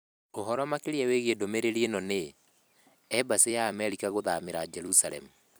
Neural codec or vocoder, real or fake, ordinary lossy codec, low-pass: vocoder, 44.1 kHz, 128 mel bands every 256 samples, BigVGAN v2; fake; none; none